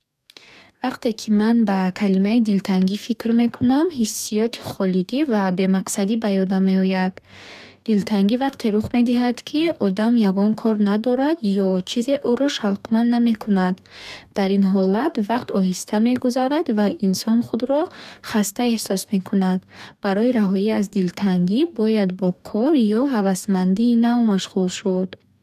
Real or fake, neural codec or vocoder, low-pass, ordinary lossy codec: fake; codec, 44.1 kHz, 2.6 kbps, DAC; 14.4 kHz; none